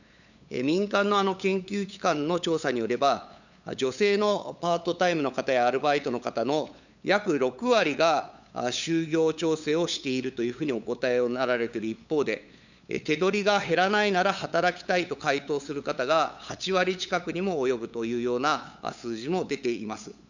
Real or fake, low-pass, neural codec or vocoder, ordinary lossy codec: fake; 7.2 kHz; codec, 16 kHz, 8 kbps, FunCodec, trained on LibriTTS, 25 frames a second; none